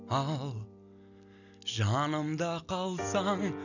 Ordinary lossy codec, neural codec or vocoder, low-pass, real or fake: none; none; 7.2 kHz; real